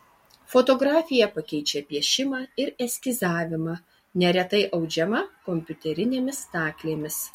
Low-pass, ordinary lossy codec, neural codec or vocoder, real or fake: 19.8 kHz; MP3, 64 kbps; none; real